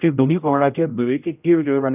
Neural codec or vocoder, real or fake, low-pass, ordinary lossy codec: codec, 16 kHz, 0.5 kbps, X-Codec, HuBERT features, trained on general audio; fake; 3.6 kHz; none